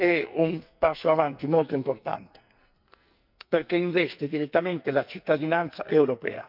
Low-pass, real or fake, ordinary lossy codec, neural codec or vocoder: 5.4 kHz; fake; none; codec, 44.1 kHz, 2.6 kbps, SNAC